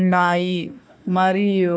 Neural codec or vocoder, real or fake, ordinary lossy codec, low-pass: codec, 16 kHz, 1 kbps, FunCodec, trained on Chinese and English, 50 frames a second; fake; none; none